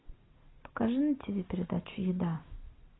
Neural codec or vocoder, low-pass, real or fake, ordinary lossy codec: none; 7.2 kHz; real; AAC, 16 kbps